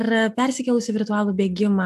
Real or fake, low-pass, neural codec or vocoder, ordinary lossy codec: real; 14.4 kHz; none; AAC, 96 kbps